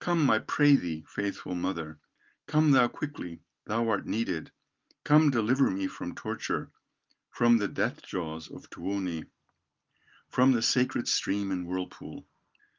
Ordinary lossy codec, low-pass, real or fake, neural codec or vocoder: Opus, 32 kbps; 7.2 kHz; real; none